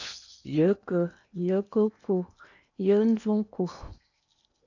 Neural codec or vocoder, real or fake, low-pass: codec, 16 kHz in and 24 kHz out, 0.8 kbps, FocalCodec, streaming, 65536 codes; fake; 7.2 kHz